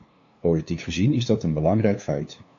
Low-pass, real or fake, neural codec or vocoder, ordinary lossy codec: 7.2 kHz; fake; codec, 16 kHz, 2 kbps, FunCodec, trained on LibriTTS, 25 frames a second; AAC, 48 kbps